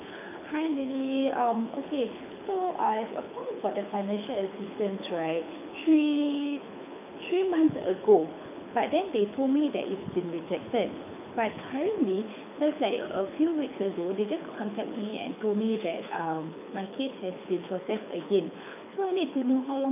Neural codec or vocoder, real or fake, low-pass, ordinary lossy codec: codec, 24 kHz, 6 kbps, HILCodec; fake; 3.6 kHz; none